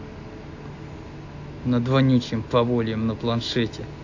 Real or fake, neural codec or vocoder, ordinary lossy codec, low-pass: real; none; AAC, 48 kbps; 7.2 kHz